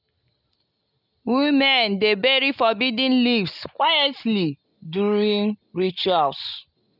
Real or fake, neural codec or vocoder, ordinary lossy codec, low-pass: real; none; none; 5.4 kHz